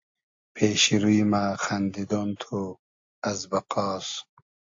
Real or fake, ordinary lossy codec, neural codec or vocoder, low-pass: real; AAC, 48 kbps; none; 7.2 kHz